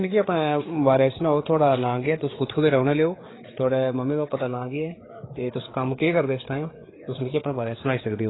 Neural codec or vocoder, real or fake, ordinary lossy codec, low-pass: codec, 16 kHz, 4 kbps, FreqCodec, larger model; fake; AAC, 16 kbps; 7.2 kHz